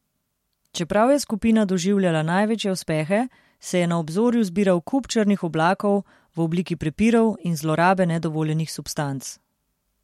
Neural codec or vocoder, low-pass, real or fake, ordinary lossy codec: none; 19.8 kHz; real; MP3, 64 kbps